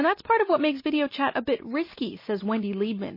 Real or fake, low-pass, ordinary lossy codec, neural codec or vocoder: real; 5.4 kHz; MP3, 24 kbps; none